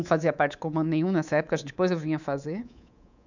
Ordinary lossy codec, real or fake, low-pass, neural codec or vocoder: none; fake; 7.2 kHz; codec, 24 kHz, 3.1 kbps, DualCodec